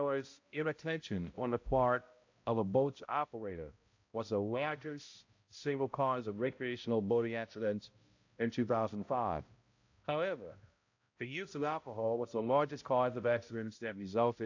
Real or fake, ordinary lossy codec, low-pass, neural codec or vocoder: fake; AAC, 48 kbps; 7.2 kHz; codec, 16 kHz, 0.5 kbps, X-Codec, HuBERT features, trained on balanced general audio